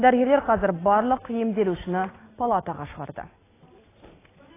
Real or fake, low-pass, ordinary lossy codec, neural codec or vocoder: real; 3.6 kHz; AAC, 16 kbps; none